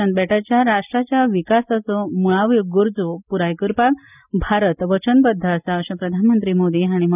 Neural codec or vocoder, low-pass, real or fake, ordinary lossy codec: none; 3.6 kHz; real; none